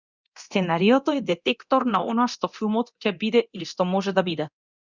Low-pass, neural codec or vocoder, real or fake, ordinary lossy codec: 7.2 kHz; codec, 24 kHz, 0.9 kbps, DualCodec; fake; Opus, 64 kbps